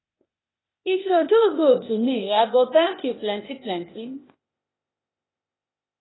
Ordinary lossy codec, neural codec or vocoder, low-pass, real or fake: AAC, 16 kbps; codec, 16 kHz, 0.8 kbps, ZipCodec; 7.2 kHz; fake